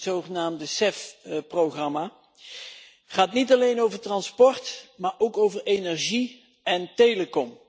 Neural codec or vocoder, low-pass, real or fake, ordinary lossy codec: none; none; real; none